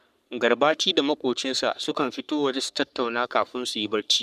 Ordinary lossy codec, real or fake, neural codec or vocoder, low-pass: none; fake; codec, 44.1 kHz, 3.4 kbps, Pupu-Codec; 14.4 kHz